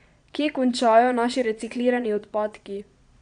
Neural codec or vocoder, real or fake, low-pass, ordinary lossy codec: vocoder, 22.05 kHz, 80 mel bands, Vocos; fake; 9.9 kHz; none